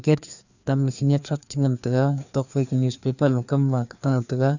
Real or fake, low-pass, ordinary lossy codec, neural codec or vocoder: fake; 7.2 kHz; none; codec, 16 kHz, 2 kbps, FreqCodec, larger model